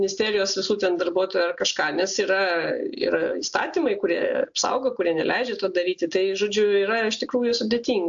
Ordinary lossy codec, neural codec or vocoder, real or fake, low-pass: AAC, 64 kbps; none; real; 7.2 kHz